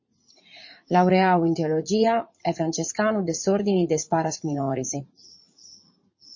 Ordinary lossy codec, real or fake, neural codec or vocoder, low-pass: MP3, 32 kbps; fake; vocoder, 44.1 kHz, 80 mel bands, Vocos; 7.2 kHz